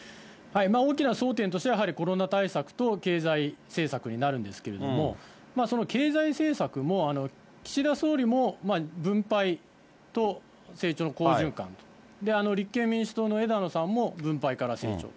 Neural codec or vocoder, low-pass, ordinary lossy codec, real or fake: none; none; none; real